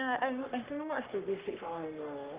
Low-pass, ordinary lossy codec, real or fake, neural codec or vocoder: 3.6 kHz; Opus, 24 kbps; fake; codec, 44.1 kHz, 3.4 kbps, Pupu-Codec